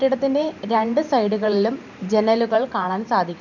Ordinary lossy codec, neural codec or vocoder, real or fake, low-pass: none; vocoder, 44.1 kHz, 128 mel bands every 512 samples, BigVGAN v2; fake; 7.2 kHz